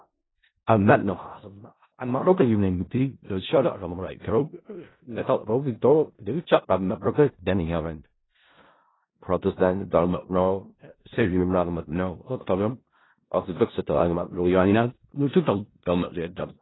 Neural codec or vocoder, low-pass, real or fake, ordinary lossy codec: codec, 16 kHz in and 24 kHz out, 0.4 kbps, LongCat-Audio-Codec, four codebook decoder; 7.2 kHz; fake; AAC, 16 kbps